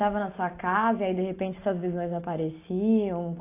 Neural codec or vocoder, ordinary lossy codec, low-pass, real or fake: none; AAC, 24 kbps; 3.6 kHz; real